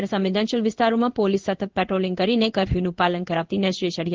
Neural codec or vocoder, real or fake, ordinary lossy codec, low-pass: codec, 16 kHz in and 24 kHz out, 1 kbps, XY-Tokenizer; fake; Opus, 16 kbps; 7.2 kHz